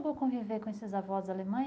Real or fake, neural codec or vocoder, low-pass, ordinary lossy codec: real; none; none; none